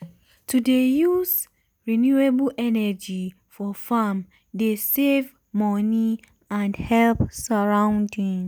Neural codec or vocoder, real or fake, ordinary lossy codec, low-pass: none; real; none; none